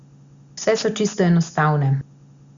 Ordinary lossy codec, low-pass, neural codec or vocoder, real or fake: Opus, 64 kbps; 7.2 kHz; none; real